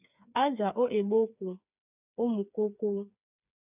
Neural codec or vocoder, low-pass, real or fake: codec, 16 kHz, 4 kbps, FreqCodec, smaller model; 3.6 kHz; fake